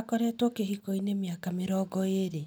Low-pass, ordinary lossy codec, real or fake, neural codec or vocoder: none; none; real; none